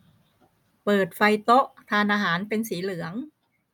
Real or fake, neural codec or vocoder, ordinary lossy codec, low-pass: real; none; none; 19.8 kHz